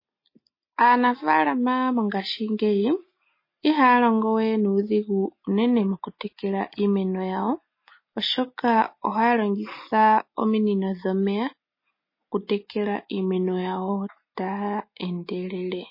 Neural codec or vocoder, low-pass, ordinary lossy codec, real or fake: none; 5.4 kHz; MP3, 24 kbps; real